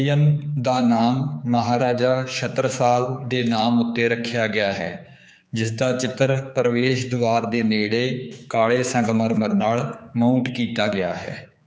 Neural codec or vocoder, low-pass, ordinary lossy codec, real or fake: codec, 16 kHz, 4 kbps, X-Codec, HuBERT features, trained on general audio; none; none; fake